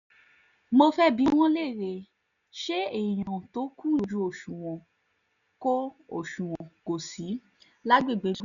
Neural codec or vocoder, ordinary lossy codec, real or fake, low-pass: none; none; real; 7.2 kHz